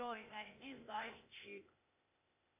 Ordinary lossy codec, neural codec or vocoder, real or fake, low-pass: AAC, 32 kbps; codec, 16 kHz, 0.8 kbps, ZipCodec; fake; 3.6 kHz